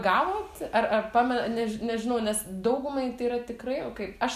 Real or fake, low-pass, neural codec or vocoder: real; 14.4 kHz; none